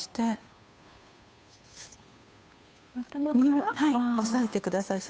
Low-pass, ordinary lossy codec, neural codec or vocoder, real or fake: none; none; codec, 16 kHz, 2 kbps, FunCodec, trained on Chinese and English, 25 frames a second; fake